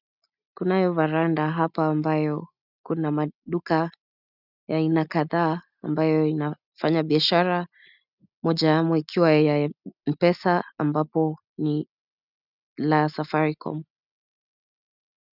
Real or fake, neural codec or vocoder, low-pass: real; none; 5.4 kHz